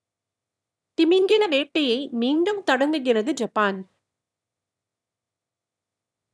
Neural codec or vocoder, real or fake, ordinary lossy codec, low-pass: autoencoder, 22.05 kHz, a latent of 192 numbers a frame, VITS, trained on one speaker; fake; none; none